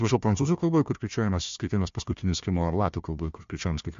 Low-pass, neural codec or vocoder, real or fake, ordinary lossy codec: 7.2 kHz; codec, 16 kHz, 1 kbps, FunCodec, trained on Chinese and English, 50 frames a second; fake; MP3, 48 kbps